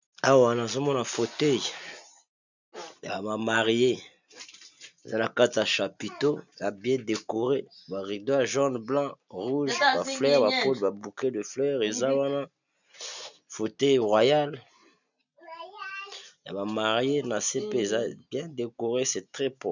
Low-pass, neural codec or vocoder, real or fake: 7.2 kHz; none; real